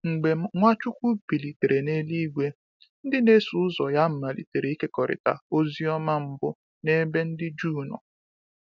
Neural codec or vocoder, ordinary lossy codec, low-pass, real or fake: none; none; 7.2 kHz; real